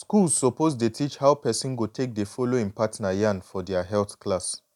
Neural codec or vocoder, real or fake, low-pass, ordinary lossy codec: none; real; 19.8 kHz; none